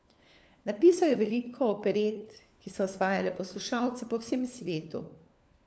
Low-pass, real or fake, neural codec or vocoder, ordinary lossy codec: none; fake; codec, 16 kHz, 4 kbps, FunCodec, trained on LibriTTS, 50 frames a second; none